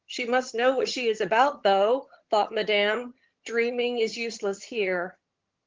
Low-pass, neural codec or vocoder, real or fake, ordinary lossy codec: 7.2 kHz; vocoder, 22.05 kHz, 80 mel bands, HiFi-GAN; fake; Opus, 16 kbps